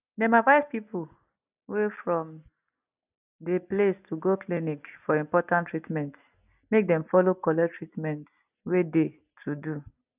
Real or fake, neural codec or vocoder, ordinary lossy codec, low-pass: real; none; none; 3.6 kHz